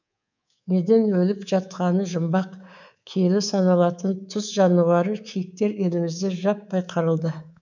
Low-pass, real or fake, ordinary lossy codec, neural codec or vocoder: 7.2 kHz; fake; none; codec, 24 kHz, 3.1 kbps, DualCodec